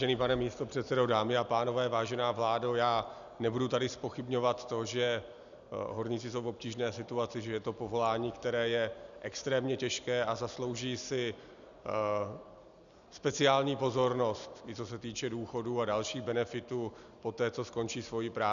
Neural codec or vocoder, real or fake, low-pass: none; real; 7.2 kHz